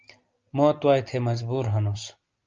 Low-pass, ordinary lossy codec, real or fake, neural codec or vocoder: 7.2 kHz; Opus, 24 kbps; real; none